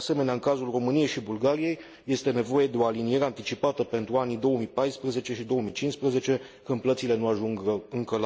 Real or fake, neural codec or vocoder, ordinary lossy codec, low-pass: real; none; none; none